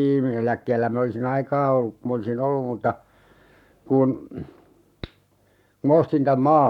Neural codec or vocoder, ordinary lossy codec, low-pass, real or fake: codec, 44.1 kHz, 7.8 kbps, Pupu-Codec; none; 19.8 kHz; fake